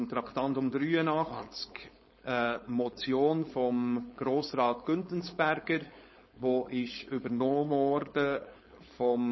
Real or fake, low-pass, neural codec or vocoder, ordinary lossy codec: fake; 7.2 kHz; codec, 16 kHz, 4.8 kbps, FACodec; MP3, 24 kbps